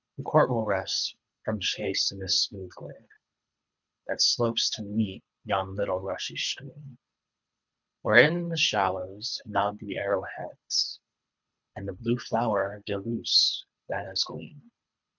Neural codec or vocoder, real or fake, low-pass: codec, 24 kHz, 3 kbps, HILCodec; fake; 7.2 kHz